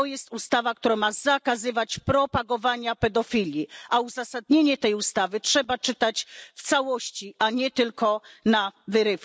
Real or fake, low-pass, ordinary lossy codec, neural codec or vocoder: real; none; none; none